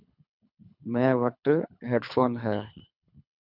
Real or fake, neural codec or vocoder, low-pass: fake; codec, 24 kHz, 3 kbps, HILCodec; 5.4 kHz